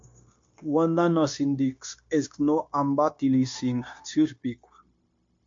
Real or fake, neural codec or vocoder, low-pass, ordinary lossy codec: fake; codec, 16 kHz, 0.9 kbps, LongCat-Audio-Codec; 7.2 kHz; MP3, 48 kbps